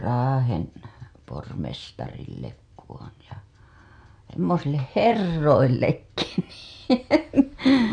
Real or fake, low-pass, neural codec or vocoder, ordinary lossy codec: real; 9.9 kHz; none; none